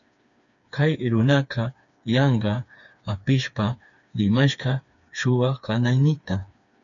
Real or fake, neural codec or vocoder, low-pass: fake; codec, 16 kHz, 4 kbps, FreqCodec, smaller model; 7.2 kHz